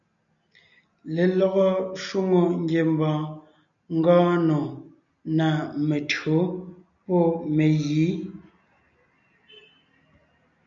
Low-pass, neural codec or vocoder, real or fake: 7.2 kHz; none; real